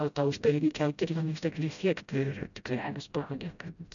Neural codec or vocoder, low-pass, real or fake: codec, 16 kHz, 0.5 kbps, FreqCodec, smaller model; 7.2 kHz; fake